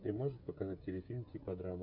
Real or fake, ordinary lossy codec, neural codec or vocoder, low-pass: fake; MP3, 48 kbps; codec, 16 kHz, 16 kbps, FreqCodec, smaller model; 5.4 kHz